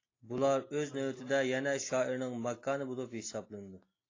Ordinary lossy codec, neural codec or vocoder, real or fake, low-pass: AAC, 32 kbps; none; real; 7.2 kHz